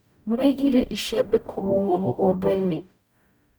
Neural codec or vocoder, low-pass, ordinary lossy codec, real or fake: codec, 44.1 kHz, 0.9 kbps, DAC; none; none; fake